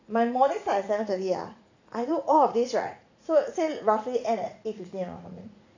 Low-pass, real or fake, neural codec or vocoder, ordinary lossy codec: 7.2 kHz; fake; vocoder, 44.1 kHz, 80 mel bands, Vocos; AAC, 48 kbps